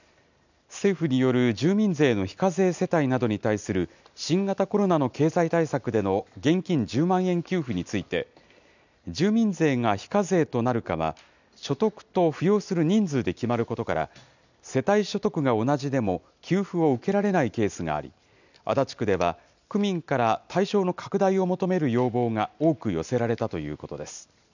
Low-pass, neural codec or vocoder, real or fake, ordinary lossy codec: 7.2 kHz; none; real; none